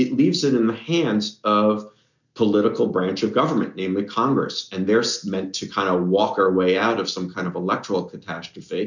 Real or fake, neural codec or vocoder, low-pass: real; none; 7.2 kHz